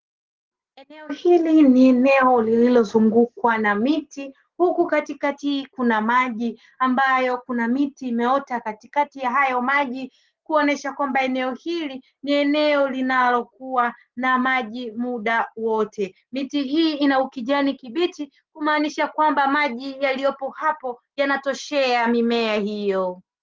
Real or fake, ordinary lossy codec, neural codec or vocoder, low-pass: real; Opus, 16 kbps; none; 7.2 kHz